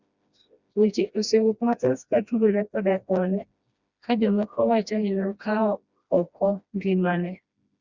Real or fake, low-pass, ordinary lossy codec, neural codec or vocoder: fake; 7.2 kHz; Opus, 64 kbps; codec, 16 kHz, 1 kbps, FreqCodec, smaller model